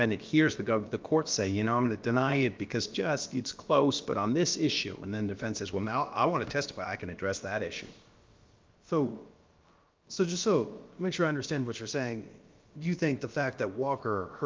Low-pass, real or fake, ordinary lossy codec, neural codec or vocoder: 7.2 kHz; fake; Opus, 32 kbps; codec, 16 kHz, about 1 kbps, DyCAST, with the encoder's durations